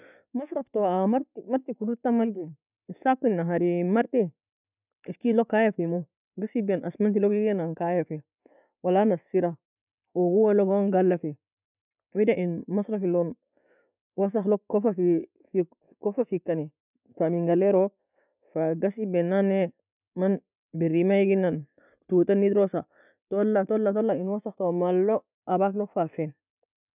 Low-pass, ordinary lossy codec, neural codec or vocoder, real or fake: 3.6 kHz; none; none; real